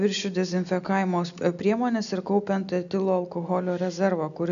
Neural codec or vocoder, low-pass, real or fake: none; 7.2 kHz; real